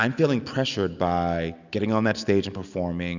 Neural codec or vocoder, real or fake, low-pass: none; real; 7.2 kHz